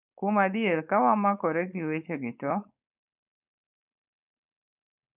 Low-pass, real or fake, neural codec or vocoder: 3.6 kHz; fake; codec, 16 kHz, 4.8 kbps, FACodec